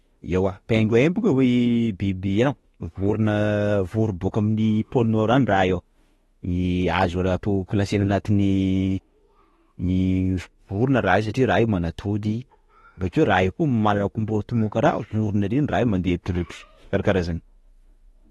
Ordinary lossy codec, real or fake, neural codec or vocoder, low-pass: AAC, 32 kbps; fake; autoencoder, 48 kHz, 32 numbers a frame, DAC-VAE, trained on Japanese speech; 19.8 kHz